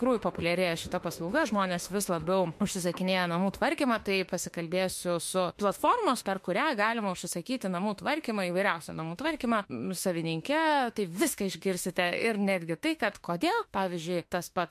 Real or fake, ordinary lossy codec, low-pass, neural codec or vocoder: fake; MP3, 64 kbps; 14.4 kHz; autoencoder, 48 kHz, 32 numbers a frame, DAC-VAE, trained on Japanese speech